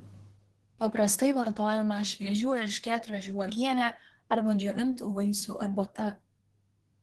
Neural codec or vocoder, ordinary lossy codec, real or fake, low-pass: codec, 24 kHz, 1 kbps, SNAC; Opus, 16 kbps; fake; 10.8 kHz